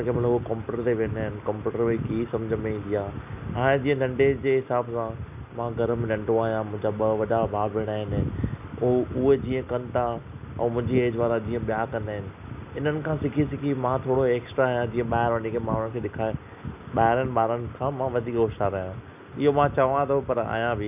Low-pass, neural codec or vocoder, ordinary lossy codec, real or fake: 3.6 kHz; none; none; real